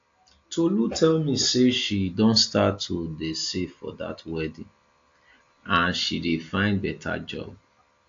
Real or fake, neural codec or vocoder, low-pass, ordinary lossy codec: real; none; 7.2 kHz; AAC, 48 kbps